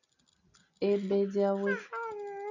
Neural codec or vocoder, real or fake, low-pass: none; real; 7.2 kHz